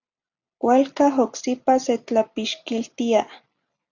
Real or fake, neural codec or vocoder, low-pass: real; none; 7.2 kHz